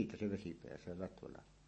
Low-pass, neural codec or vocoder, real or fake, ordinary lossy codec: 9.9 kHz; none; real; MP3, 32 kbps